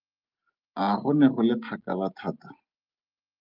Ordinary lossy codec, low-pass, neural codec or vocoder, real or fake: Opus, 32 kbps; 5.4 kHz; none; real